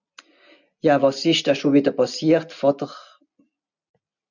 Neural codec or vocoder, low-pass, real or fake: none; 7.2 kHz; real